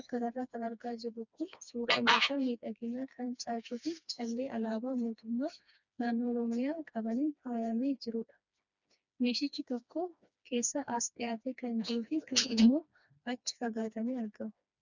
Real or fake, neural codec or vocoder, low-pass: fake; codec, 16 kHz, 2 kbps, FreqCodec, smaller model; 7.2 kHz